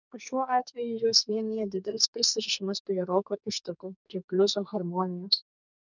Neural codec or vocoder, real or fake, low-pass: codec, 44.1 kHz, 2.6 kbps, SNAC; fake; 7.2 kHz